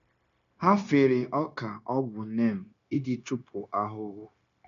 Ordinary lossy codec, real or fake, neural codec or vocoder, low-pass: MP3, 64 kbps; fake; codec, 16 kHz, 0.9 kbps, LongCat-Audio-Codec; 7.2 kHz